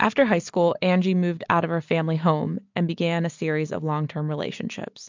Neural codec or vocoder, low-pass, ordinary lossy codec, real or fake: none; 7.2 kHz; MP3, 64 kbps; real